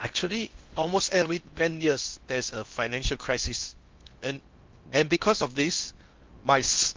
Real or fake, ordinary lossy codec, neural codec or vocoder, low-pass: fake; Opus, 24 kbps; codec, 16 kHz in and 24 kHz out, 0.6 kbps, FocalCodec, streaming, 4096 codes; 7.2 kHz